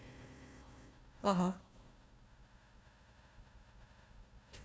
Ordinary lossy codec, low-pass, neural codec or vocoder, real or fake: none; none; codec, 16 kHz, 1 kbps, FunCodec, trained on Chinese and English, 50 frames a second; fake